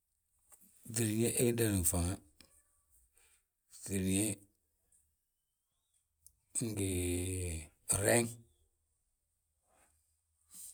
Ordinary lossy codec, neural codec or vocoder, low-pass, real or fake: none; none; none; real